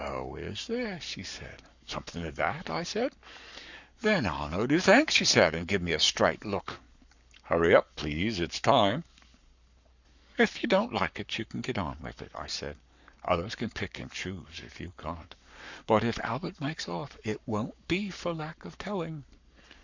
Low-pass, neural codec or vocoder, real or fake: 7.2 kHz; codec, 44.1 kHz, 7.8 kbps, Pupu-Codec; fake